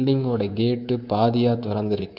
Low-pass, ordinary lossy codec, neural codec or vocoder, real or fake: 5.4 kHz; none; codec, 24 kHz, 3.1 kbps, DualCodec; fake